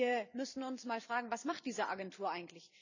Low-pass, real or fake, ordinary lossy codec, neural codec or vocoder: 7.2 kHz; fake; none; vocoder, 44.1 kHz, 128 mel bands every 256 samples, BigVGAN v2